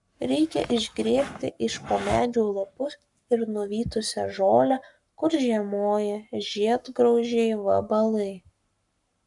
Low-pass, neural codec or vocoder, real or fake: 10.8 kHz; codec, 44.1 kHz, 7.8 kbps, Pupu-Codec; fake